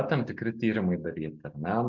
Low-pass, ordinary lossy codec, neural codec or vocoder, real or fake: 7.2 kHz; MP3, 64 kbps; none; real